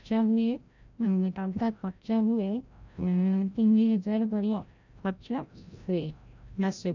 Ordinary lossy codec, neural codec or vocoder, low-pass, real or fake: none; codec, 16 kHz, 0.5 kbps, FreqCodec, larger model; 7.2 kHz; fake